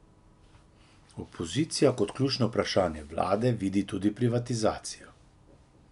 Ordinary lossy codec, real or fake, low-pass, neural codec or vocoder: none; real; 10.8 kHz; none